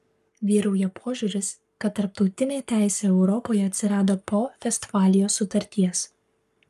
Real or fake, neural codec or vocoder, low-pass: fake; codec, 44.1 kHz, 7.8 kbps, Pupu-Codec; 14.4 kHz